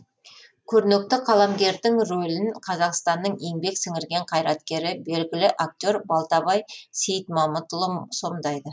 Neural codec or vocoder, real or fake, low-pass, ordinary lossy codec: none; real; none; none